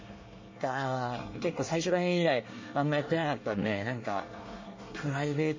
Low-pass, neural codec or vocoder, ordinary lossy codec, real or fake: 7.2 kHz; codec, 24 kHz, 1 kbps, SNAC; MP3, 32 kbps; fake